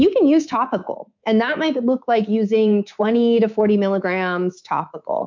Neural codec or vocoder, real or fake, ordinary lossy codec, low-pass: codec, 24 kHz, 3.1 kbps, DualCodec; fake; MP3, 64 kbps; 7.2 kHz